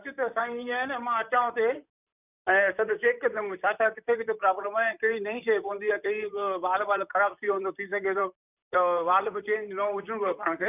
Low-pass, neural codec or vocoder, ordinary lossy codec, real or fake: 3.6 kHz; vocoder, 44.1 kHz, 128 mel bands, Pupu-Vocoder; none; fake